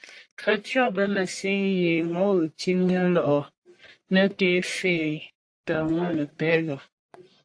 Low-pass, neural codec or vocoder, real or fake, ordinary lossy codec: 9.9 kHz; codec, 44.1 kHz, 1.7 kbps, Pupu-Codec; fake; AAC, 48 kbps